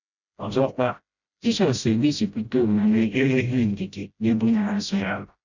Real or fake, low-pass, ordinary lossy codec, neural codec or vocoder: fake; 7.2 kHz; none; codec, 16 kHz, 0.5 kbps, FreqCodec, smaller model